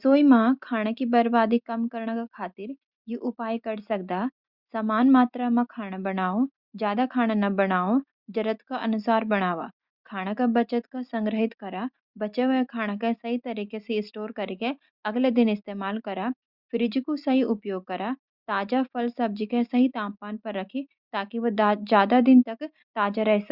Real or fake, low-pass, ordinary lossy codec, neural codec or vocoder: real; 5.4 kHz; Opus, 64 kbps; none